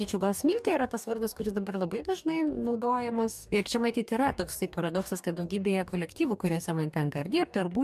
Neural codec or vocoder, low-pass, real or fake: codec, 44.1 kHz, 2.6 kbps, DAC; 14.4 kHz; fake